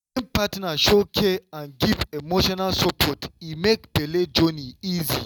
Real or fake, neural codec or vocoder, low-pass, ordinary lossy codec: real; none; 19.8 kHz; none